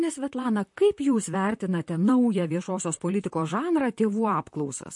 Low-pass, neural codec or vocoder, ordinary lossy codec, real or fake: 10.8 kHz; vocoder, 44.1 kHz, 128 mel bands, Pupu-Vocoder; MP3, 48 kbps; fake